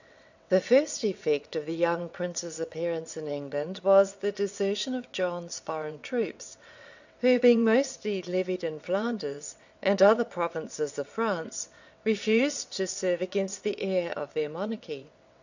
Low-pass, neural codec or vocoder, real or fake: 7.2 kHz; vocoder, 22.05 kHz, 80 mel bands, WaveNeXt; fake